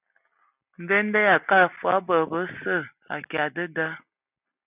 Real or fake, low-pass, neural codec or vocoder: real; 3.6 kHz; none